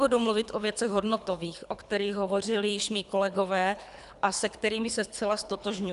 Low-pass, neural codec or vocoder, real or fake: 10.8 kHz; codec, 24 kHz, 3 kbps, HILCodec; fake